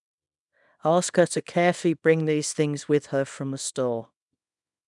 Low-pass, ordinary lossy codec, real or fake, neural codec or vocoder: 10.8 kHz; none; fake; codec, 24 kHz, 0.9 kbps, WavTokenizer, small release